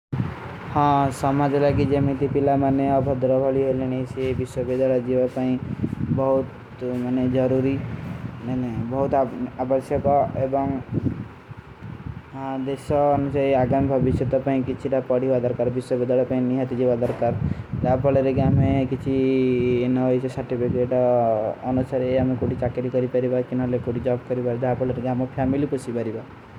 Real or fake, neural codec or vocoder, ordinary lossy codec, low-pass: real; none; none; 19.8 kHz